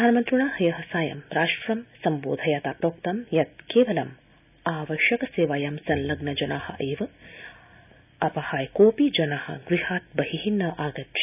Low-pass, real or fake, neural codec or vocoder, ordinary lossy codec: 3.6 kHz; real; none; none